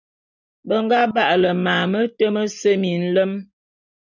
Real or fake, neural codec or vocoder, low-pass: real; none; 7.2 kHz